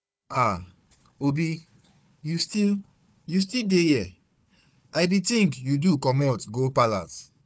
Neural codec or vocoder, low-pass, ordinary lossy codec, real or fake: codec, 16 kHz, 4 kbps, FunCodec, trained on Chinese and English, 50 frames a second; none; none; fake